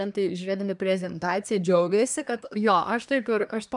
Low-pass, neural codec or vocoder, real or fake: 10.8 kHz; codec, 24 kHz, 1 kbps, SNAC; fake